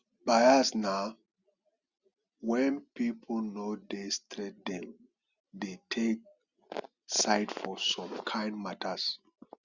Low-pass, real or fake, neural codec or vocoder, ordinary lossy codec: 7.2 kHz; real; none; Opus, 64 kbps